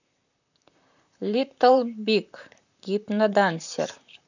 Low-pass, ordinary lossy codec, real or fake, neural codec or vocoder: 7.2 kHz; none; fake; vocoder, 44.1 kHz, 128 mel bands, Pupu-Vocoder